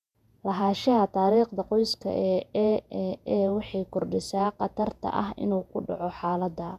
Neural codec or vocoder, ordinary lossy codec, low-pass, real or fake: vocoder, 48 kHz, 128 mel bands, Vocos; none; 14.4 kHz; fake